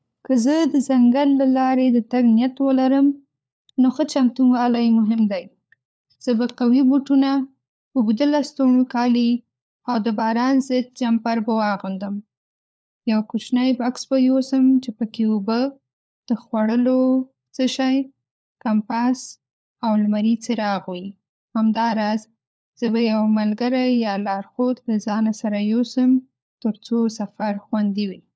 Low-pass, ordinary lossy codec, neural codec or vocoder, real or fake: none; none; codec, 16 kHz, 4 kbps, FunCodec, trained on LibriTTS, 50 frames a second; fake